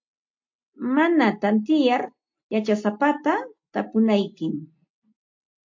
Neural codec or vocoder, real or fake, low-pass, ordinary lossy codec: none; real; 7.2 kHz; MP3, 48 kbps